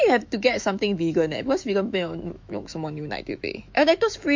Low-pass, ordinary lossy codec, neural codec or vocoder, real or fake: 7.2 kHz; MP3, 48 kbps; autoencoder, 48 kHz, 128 numbers a frame, DAC-VAE, trained on Japanese speech; fake